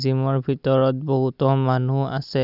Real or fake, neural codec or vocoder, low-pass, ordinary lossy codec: real; none; 5.4 kHz; none